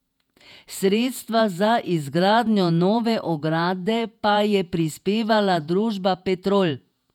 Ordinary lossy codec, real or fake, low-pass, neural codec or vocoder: none; fake; 19.8 kHz; vocoder, 48 kHz, 128 mel bands, Vocos